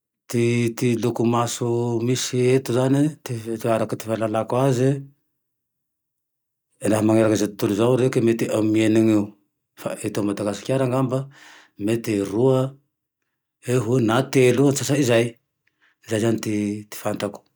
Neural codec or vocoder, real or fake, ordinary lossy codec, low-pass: none; real; none; none